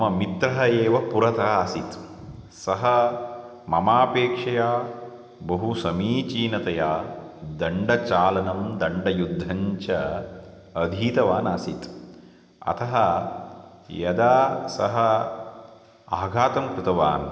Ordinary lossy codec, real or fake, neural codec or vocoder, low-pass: none; real; none; none